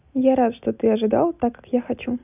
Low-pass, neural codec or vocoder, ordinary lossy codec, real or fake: 3.6 kHz; none; none; real